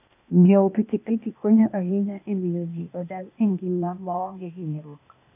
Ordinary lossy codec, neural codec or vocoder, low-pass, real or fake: none; codec, 16 kHz, 0.8 kbps, ZipCodec; 3.6 kHz; fake